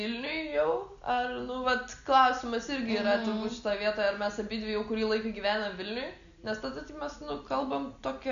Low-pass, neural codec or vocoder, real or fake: 7.2 kHz; none; real